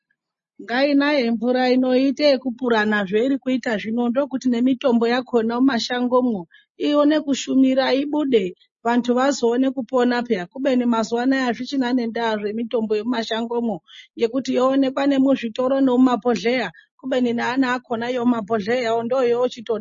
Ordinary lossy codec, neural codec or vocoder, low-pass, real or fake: MP3, 32 kbps; none; 7.2 kHz; real